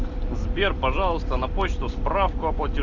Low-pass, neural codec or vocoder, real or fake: 7.2 kHz; none; real